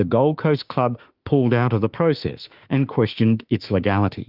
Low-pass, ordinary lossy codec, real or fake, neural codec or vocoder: 5.4 kHz; Opus, 24 kbps; fake; autoencoder, 48 kHz, 32 numbers a frame, DAC-VAE, trained on Japanese speech